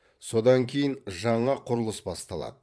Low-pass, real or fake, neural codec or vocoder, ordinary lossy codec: none; fake; vocoder, 22.05 kHz, 80 mel bands, Vocos; none